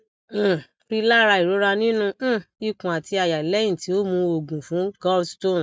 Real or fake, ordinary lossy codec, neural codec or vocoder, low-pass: real; none; none; none